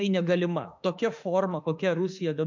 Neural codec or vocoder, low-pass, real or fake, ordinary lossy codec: autoencoder, 48 kHz, 32 numbers a frame, DAC-VAE, trained on Japanese speech; 7.2 kHz; fake; MP3, 64 kbps